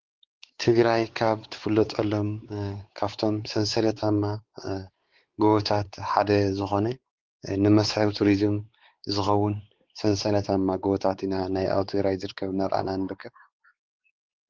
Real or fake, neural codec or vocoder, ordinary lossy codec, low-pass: fake; codec, 16 kHz, 4 kbps, X-Codec, WavLM features, trained on Multilingual LibriSpeech; Opus, 16 kbps; 7.2 kHz